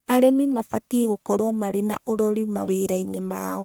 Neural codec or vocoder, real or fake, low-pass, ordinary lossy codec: codec, 44.1 kHz, 1.7 kbps, Pupu-Codec; fake; none; none